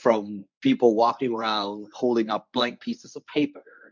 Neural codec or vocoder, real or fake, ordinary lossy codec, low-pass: codec, 24 kHz, 0.9 kbps, WavTokenizer, medium speech release version 1; fake; MP3, 64 kbps; 7.2 kHz